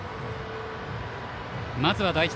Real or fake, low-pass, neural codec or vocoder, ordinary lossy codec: real; none; none; none